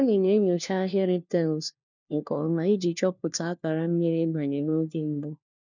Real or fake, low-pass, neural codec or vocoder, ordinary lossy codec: fake; 7.2 kHz; codec, 16 kHz, 1 kbps, FunCodec, trained on LibriTTS, 50 frames a second; none